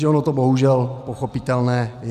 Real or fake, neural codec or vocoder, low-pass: real; none; 14.4 kHz